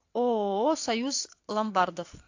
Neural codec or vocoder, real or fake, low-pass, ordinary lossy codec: vocoder, 22.05 kHz, 80 mel bands, WaveNeXt; fake; 7.2 kHz; AAC, 48 kbps